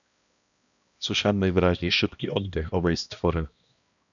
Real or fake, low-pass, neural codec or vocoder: fake; 7.2 kHz; codec, 16 kHz, 1 kbps, X-Codec, HuBERT features, trained on balanced general audio